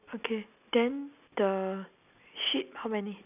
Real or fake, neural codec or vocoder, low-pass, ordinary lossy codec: real; none; 3.6 kHz; none